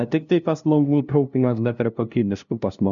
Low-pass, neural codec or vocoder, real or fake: 7.2 kHz; codec, 16 kHz, 0.5 kbps, FunCodec, trained on LibriTTS, 25 frames a second; fake